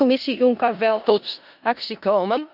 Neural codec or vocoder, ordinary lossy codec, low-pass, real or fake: codec, 16 kHz in and 24 kHz out, 0.4 kbps, LongCat-Audio-Codec, four codebook decoder; none; 5.4 kHz; fake